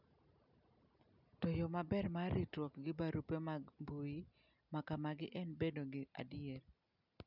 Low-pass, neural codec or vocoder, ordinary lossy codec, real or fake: 5.4 kHz; none; none; real